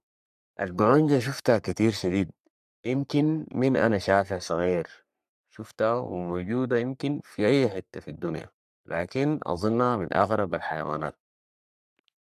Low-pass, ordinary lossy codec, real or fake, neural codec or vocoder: 14.4 kHz; none; fake; codec, 44.1 kHz, 3.4 kbps, Pupu-Codec